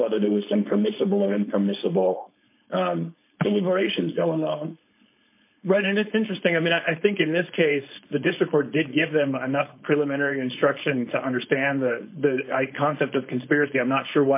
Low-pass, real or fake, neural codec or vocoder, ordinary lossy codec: 3.6 kHz; fake; codec, 16 kHz, 4.8 kbps, FACodec; MP3, 24 kbps